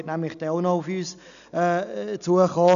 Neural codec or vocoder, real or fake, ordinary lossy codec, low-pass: none; real; none; 7.2 kHz